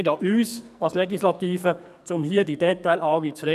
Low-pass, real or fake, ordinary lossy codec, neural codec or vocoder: 14.4 kHz; fake; none; codec, 44.1 kHz, 2.6 kbps, SNAC